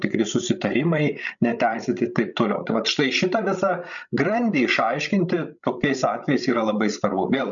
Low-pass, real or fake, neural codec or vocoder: 7.2 kHz; fake; codec, 16 kHz, 16 kbps, FreqCodec, larger model